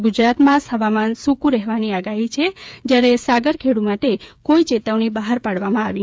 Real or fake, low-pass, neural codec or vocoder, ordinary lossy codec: fake; none; codec, 16 kHz, 8 kbps, FreqCodec, smaller model; none